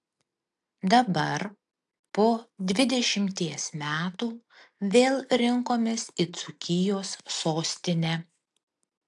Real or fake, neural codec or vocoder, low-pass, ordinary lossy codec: real; none; 10.8 kHz; AAC, 64 kbps